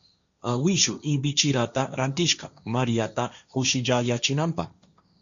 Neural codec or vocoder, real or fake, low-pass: codec, 16 kHz, 1.1 kbps, Voila-Tokenizer; fake; 7.2 kHz